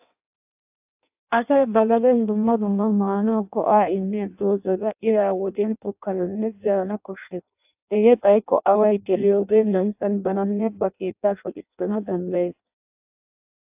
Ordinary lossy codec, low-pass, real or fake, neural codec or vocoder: AAC, 32 kbps; 3.6 kHz; fake; codec, 16 kHz in and 24 kHz out, 0.6 kbps, FireRedTTS-2 codec